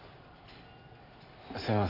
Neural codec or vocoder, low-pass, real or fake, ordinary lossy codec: none; 5.4 kHz; real; none